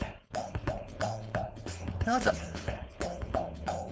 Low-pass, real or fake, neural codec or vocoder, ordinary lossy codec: none; fake; codec, 16 kHz, 4.8 kbps, FACodec; none